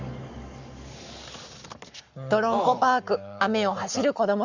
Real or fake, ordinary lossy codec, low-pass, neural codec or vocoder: fake; Opus, 64 kbps; 7.2 kHz; codec, 44.1 kHz, 7.8 kbps, Pupu-Codec